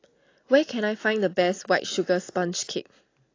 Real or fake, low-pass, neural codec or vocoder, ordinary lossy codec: real; 7.2 kHz; none; AAC, 32 kbps